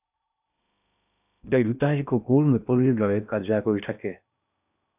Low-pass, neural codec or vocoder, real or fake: 3.6 kHz; codec, 16 kHz in and 24 kHz out, 0.6 kbps, FocalCodec, streaming, 2048 codes; fake